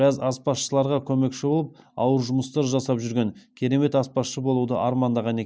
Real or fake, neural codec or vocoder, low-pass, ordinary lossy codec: real; none; none; none